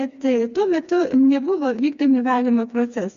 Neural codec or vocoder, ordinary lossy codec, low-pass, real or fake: codec, 16 kHz, 2 kbps, FreqCodec, smaller model; Opus, 64 kbps; 7.2 kHz; fake